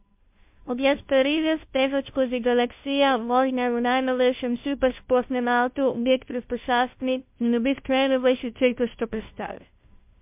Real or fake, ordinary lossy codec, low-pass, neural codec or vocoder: fake; MP3, 32 kbps; 3.6 kHz; codec, 16 kHz, 0.5 kbps, FunCodec, trained on Chinese and English, 25 frames a second